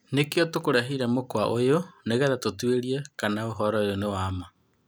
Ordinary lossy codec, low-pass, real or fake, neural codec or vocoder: none; none; real; none